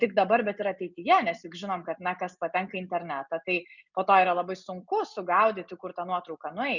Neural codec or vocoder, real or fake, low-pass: none; real; 7.2 kHz